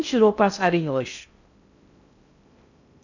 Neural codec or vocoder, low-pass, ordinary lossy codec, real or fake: codec, 16 kHz in and 24 kHz out, 0.6 kbps, FocalCodec, streaming, 2048 codes; 7.2 kHz; none; fake